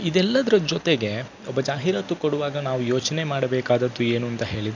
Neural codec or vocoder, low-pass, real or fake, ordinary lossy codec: none; 7.2 kHz; real; none